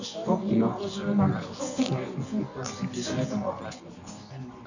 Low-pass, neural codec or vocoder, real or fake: 7.2 kHz; codec, 24 kHz, 0.9 kbps, WavTokenizer, medium music audio release; fake